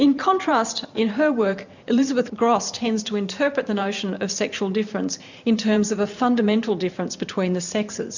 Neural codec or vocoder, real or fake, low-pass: vocoder, 44.1 kHz, 128 mel bands every 512 samples, BigVGAN v2; fake; 7.2 kHz